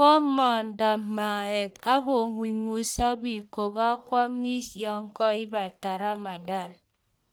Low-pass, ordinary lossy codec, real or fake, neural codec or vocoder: none; none; fake; codec, 44.1 kHz, 1.7 kbps, Pupu-Codec